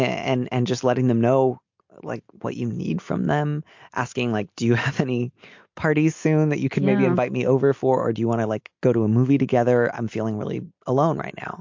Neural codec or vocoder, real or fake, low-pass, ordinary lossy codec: none; real; 7.2 kHz; MP3, 48 kbps